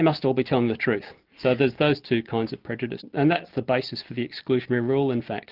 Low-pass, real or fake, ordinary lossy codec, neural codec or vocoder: 5.4 kHz; real; Opus, 32 kbps; none